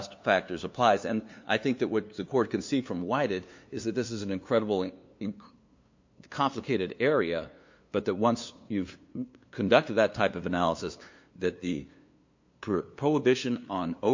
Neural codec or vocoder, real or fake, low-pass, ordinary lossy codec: codec, 16 kHz, 2 kbps, FunCodec, trained on LibriTTS, 25 frames a second; fake; 7.2 kHz; MP3, 48 kbps